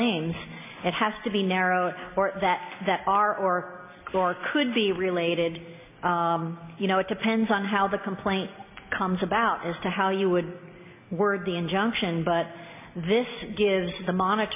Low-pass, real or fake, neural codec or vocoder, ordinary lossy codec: 3.6 kHz; real; none; MP3, 32 kbps